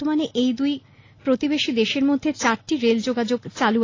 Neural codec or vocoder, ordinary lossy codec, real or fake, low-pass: none; AAC, 32 kbps; real; 7.2 kHz